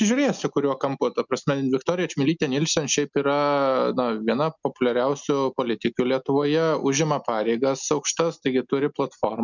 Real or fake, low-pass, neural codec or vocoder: real; 7.2 kHz; none